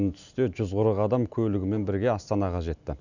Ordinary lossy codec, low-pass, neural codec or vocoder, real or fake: none; 7.2 kHz; none; real